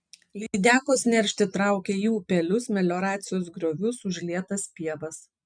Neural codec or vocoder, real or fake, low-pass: none; real; 9.9 kHz